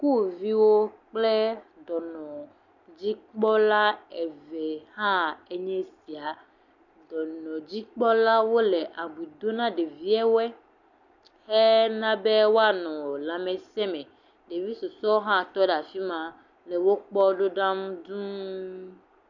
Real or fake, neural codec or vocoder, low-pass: real; none; 7.2 kHz